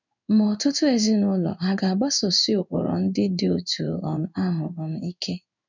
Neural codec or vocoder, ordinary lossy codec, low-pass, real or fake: codec, 16 kHz in and 24 kHz out, 1 kbps, XY-Tokenizer; none; 7.2 kHz; fake